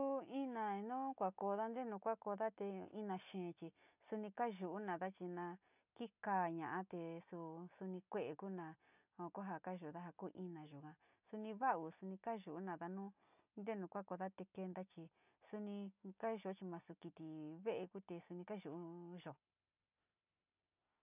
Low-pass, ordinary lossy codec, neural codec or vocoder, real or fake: 3.6 kHz; none; none; real